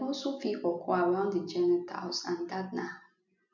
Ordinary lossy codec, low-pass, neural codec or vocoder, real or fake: none; 7.2 kHz; none; real